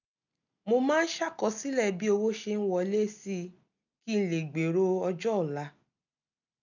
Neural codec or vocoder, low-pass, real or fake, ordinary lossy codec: none; 7.2 kHz; real; none